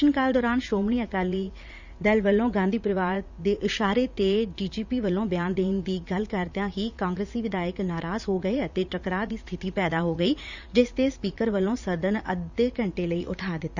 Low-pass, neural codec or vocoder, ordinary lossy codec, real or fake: 7.2 kHz; vocoder, 44.1 kHz, 80 mel bands, Vocos; Opus, 64 kbps; fake